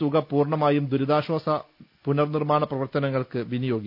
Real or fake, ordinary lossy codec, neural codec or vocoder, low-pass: real; none; none; 5.4 kHz